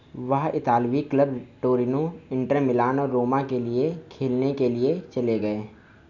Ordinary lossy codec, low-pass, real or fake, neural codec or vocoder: none; 7.2 kHz; real; none